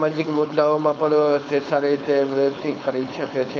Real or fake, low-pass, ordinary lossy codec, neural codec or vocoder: fake; none; none; codec, 16 kHz, 4.8 kbps, FACodec